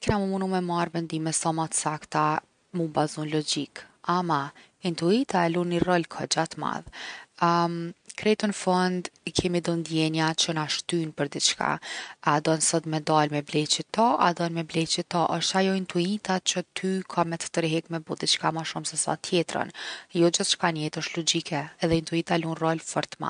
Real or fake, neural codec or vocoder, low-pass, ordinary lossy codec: real; none; 9.9 kHz; MP3, 96 kbps